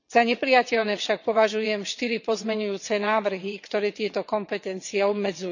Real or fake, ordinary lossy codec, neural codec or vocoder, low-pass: fake; none; vocoder, 22.05 kHz, 80 mel bands, WaveNeXt; 7.2 kHz